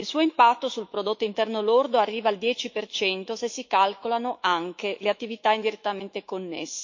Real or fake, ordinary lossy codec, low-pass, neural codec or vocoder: fake; MP3, 48 kbps; 7.2 kHz; autoencoder, 48 kHz, 128 numbers a frame, DAC-VAE, trained on Japanese speech